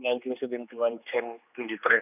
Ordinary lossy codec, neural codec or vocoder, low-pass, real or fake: none; codec, 16 kHz, 2 kbps, X-Codec, HuBERT features, trained on general audio; 3.6 kHz; fake